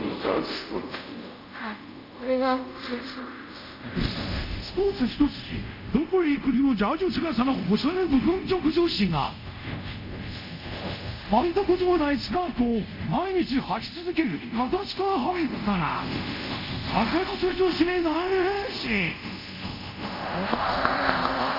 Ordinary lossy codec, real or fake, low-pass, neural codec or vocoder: MP3, 48 kbps; fake; 5.4 kHz; codec, 24 kHz, 0.5 kbps, DualCodec